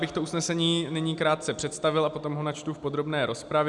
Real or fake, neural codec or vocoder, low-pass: real; none; 10.8 kHz